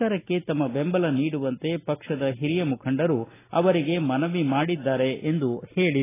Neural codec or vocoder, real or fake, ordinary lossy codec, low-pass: none; real; AAC, 16 kbps; 3.6 kHz